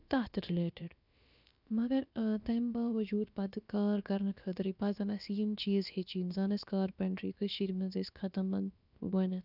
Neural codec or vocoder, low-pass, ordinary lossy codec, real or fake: codec, 16 kHz, about 1 kbps, DyCAST, with the encoder's durations; 5.4 kHz; none; fake